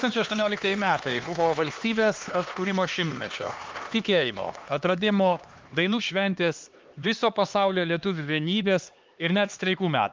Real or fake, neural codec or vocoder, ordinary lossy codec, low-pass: fake; codec, 16 kHz, 2 kbps, X-Codec, HuBERT features, trained on LibriSpeech; Opus, 24 kbps; 7.2 kHz